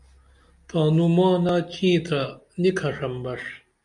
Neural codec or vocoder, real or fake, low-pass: none; real; 10.8 kHz